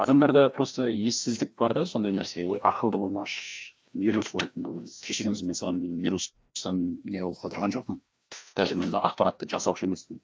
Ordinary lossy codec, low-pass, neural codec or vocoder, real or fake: none; none; codec, 16 kHz, 1 kbps, FreqCodec, larger model; fake